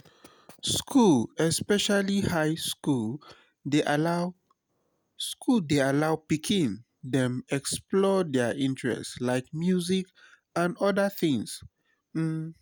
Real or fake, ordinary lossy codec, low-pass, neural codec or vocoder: real; none; none; none